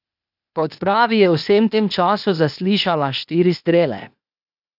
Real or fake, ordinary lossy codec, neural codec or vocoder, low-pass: fake; none; codec, 16 kHz, 0.8 kbps, ZipCodec; 5.4 kHz